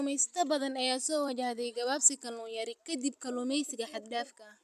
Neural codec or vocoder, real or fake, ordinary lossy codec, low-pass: vocoder, 44.1 kHz, 128 mel bands every 256 samples, BigVGAN v2; fake; none; 14.4 kHz